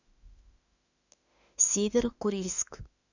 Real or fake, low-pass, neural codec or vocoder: fake; 7.2 kHz; autoencoder, 48 kHz, 32 numbers a frame, DAC-VAE, trained on Japanese speech